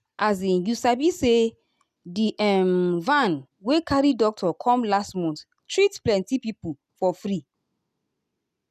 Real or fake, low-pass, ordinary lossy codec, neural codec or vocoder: real; 14.4 kHz; none; none